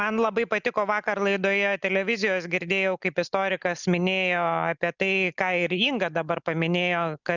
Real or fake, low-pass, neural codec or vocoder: real; 7.2 kHz; none